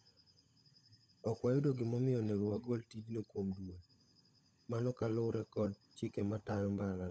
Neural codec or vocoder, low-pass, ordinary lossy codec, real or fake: codec, 16 kHz, 16 kbps, FunCodec, trained on LibriTTS, 50 frames a second; none; none; fake